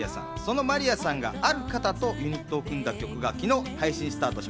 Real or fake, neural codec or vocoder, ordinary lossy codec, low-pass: real; none; none; none